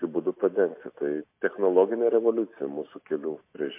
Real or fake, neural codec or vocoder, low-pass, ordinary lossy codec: real; none; 3.6 kHz; MP3, 24 kbps